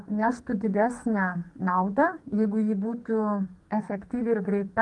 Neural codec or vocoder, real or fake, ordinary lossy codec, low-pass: codec, 44.1 kHz, 2.6 kbps, SNAC; fake; Opus, 24 kbps; 10.8 kHz